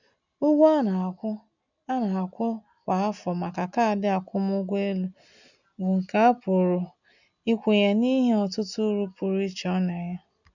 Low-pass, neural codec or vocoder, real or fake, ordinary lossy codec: 7.2 kHz; none; real; none